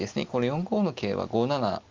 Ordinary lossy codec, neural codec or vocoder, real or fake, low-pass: Opus, 32 kbps; none; real; 7.2 kHz